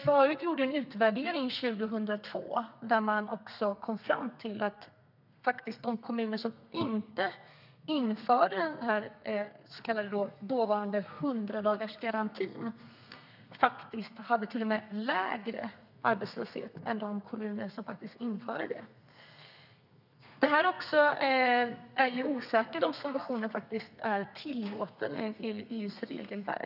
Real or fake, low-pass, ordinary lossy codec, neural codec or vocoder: fake; 5.4 kHz; none; codec, 32 kHz, 1.9 kbps, SNAC